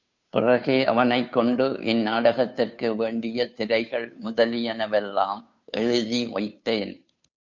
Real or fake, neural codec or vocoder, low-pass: fake; codec, 16 kHz, 2 kbps, FunCodec, trained on Chinese and English, 25 frames a second; 7.2 kHz